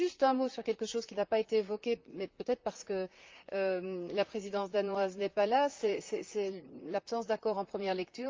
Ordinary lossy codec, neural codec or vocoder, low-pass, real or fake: Opus, 24 kbps; vocoder, 44.1 kHz, 128 mel bands, Pupu-Vocoder; 7.2 kHz; fake